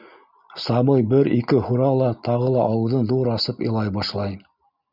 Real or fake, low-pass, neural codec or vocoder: real; 5.4 kHz; none